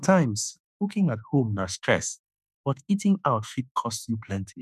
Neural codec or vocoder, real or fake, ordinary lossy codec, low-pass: autoencoder, 48 kHz, 32 numbers a frame, DAC-VAE, trained on Japanese speech; fake; none; 14.4 kHz